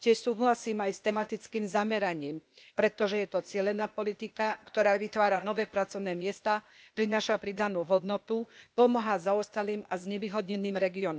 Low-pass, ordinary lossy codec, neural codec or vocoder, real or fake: none; none; codec, 16 kHz, 0.8 kbps, ZipCodec; fake